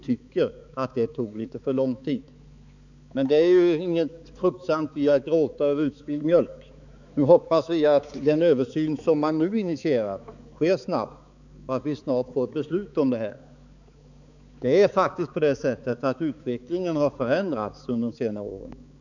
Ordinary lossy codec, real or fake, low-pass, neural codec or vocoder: none; fake; 7.2 kHz; codec, 16 kHz, 4 kbps, X-Codec, HuBERT features, trained on balanced general audio